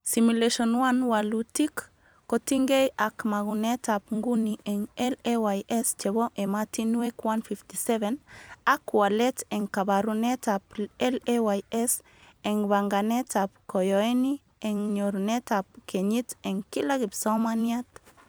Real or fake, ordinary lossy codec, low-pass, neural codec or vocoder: fake; none; none; vocoder, 44.1 kHz, 128 mel bands every 256 samples, BigVGAN v2